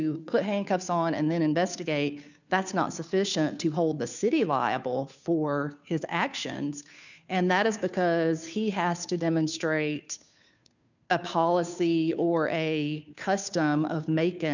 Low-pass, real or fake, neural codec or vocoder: 7.2 kHz; fake; codec, 16 kHz, 2 kbps, FunCodec, trained on Chinese and English, 25 frames a second